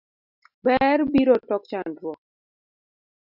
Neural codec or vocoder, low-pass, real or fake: none; 5.4 kHz; real